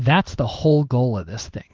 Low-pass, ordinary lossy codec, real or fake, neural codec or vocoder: 7.2 kHz; Opus, 16 kbps; fake; codec, 16 kHz in and 24 kHz out, 1 kbps, XY-Tokenizer